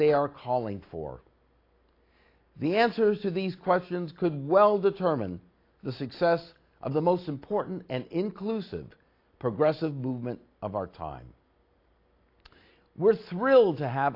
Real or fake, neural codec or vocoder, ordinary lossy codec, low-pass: real; none; AAC, 32 kbps; 5.4 kHz